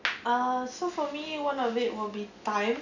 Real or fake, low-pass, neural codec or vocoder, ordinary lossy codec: real; 7.2 kHz; none; none